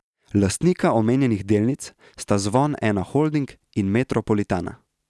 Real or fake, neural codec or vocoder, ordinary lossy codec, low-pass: real; none; none; none